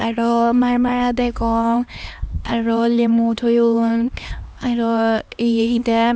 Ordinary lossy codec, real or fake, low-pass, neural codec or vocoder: none; fake; none; codec, 16 kHz, 2 kbps, X-Codec, HuBERT features, trained on LibriSpeech